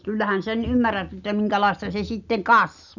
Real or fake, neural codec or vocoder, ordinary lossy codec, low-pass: real; none; none; 7.2 kHz